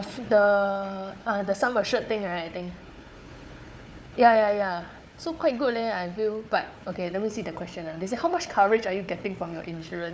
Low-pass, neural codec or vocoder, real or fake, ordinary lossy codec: none; codec, 16 kHz, 4 kbps, FunCodec, trained on Chinese and English, 50 frames a second; fake; none